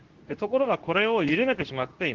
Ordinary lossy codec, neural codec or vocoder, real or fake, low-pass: Opus, 16 kbps; codec, 24 kHz, 0.9 kbps, WavTokenizer, medium speech release version 2; fake; 7.2 kHz